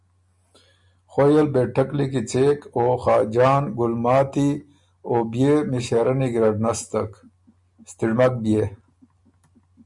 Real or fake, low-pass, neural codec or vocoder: real; 10.8 kHz; none